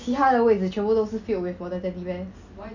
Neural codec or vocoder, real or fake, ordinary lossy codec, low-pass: none; real; none; 7.2 kHz